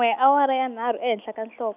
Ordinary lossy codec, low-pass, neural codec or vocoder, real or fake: none; 3.6 kHz; none; real